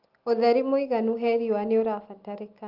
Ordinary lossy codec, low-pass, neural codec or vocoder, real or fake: Opus, 32 kbps; 5.4 kHz; none; real